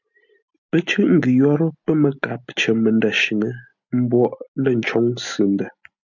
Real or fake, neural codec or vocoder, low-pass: real; none; 7.2 kHz